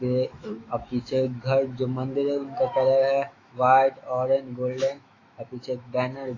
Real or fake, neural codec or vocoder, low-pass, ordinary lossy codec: fake; autoencoder, 48 kHz, 128 numbers a frame, DAC-VAE, trained on Japanese speech; 7.2 kHz; AAC, 32 kbps